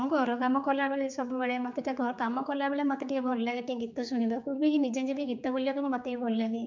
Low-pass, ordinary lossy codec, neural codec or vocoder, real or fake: 7.2 kHz; MP3, 64 kbps; codec, 16 kHz, 4 kbps, X-Codec, HuBERT features, trained on general audio; fake